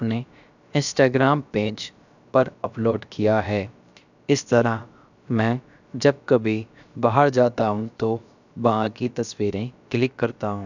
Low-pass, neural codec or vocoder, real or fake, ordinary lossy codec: 7.2 kHz; codec, 16 kHz, about 1 kbps, DyCAST, with the encoder's durations; fake; none